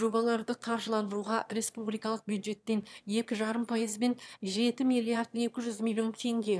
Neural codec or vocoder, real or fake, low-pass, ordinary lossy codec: autoencoder, 22.05 kHz, a latent of 192 numbers a frame, VITS, trained on one speaker; fake; none; none